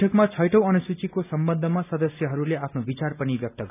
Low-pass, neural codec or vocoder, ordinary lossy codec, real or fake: 3.6 kHz; none; none; real